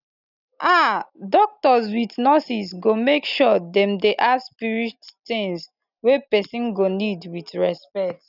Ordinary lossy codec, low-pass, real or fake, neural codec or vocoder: none; 5.4 kHz; real; none